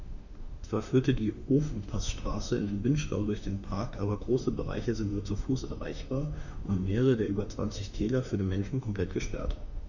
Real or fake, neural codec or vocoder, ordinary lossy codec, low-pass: fake; autoencoder, 48 kHz, 32 numbers a frame, DAC-VAE, trained on Japanese speech; none; 7.2 kHz